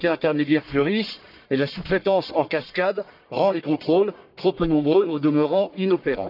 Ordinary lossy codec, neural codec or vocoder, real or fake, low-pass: none; codec, 44.1 kHz, 1.7 kbps, Pupu-Codec; fake; 5.4 kHz